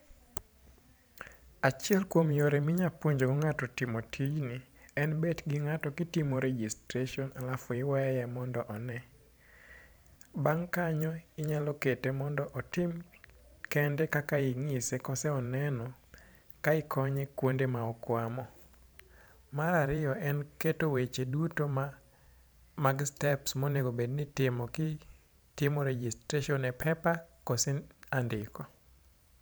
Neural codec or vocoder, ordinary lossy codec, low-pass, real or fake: vocoder, 44.1 kHz, 128 mel bands every 512 samples, BigVGAN v2; none; none; fake